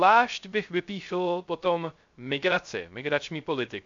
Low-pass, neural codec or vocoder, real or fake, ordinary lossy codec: 7.2 kHz; codec, 16 kHz, 0.3 kbps, FocalCodec; fake; MP3, 64 kbps